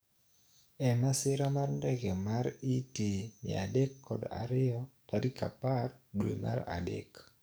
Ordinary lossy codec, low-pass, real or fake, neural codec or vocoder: none; none; fake; codec, 44.1 kHz, 7.8 kbps, DAC